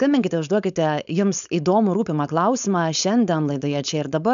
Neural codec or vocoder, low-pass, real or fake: codec, 16 kHz, 4.8 kbps, FACodec; 7.2 kHz; fake